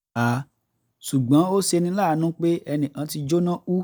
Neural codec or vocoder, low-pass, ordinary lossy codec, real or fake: none; none; none; real